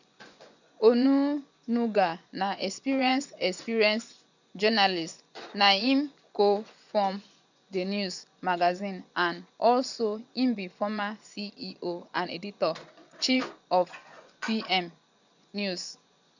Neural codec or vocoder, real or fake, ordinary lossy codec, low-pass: vocoder, 24 kHz, 100 mel bands, Vocos; fake; none; 7.2 kHz